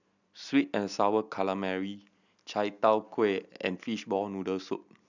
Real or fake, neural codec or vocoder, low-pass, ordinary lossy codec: real; none; 7.2 kHz; none